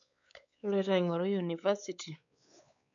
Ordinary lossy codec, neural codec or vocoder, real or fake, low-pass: none; codec, 16 kHz, 4 kbps, X-Codec, WavLM features, trained on Multilingual LibriSpeech; fake; 7.2 kHz